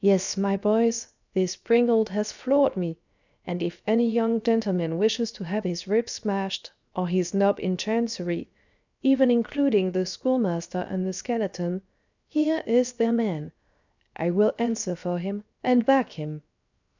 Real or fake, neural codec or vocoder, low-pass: fake; codec, 16 kHz, 0.7 kbps, FocalCodec; 7.2 kHz